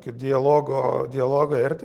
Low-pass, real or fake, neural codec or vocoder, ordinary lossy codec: 19.8 kHz; real; none; Opus, 16 kbps